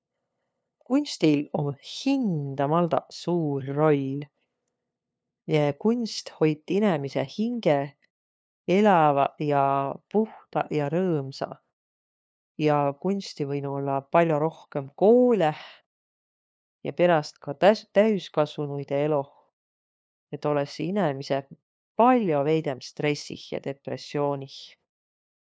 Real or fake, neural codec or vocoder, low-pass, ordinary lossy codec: fake; codec, 16 kHz, 2 kbps, FunCodec, trained on LibriTTS, 25 frames a second; none; none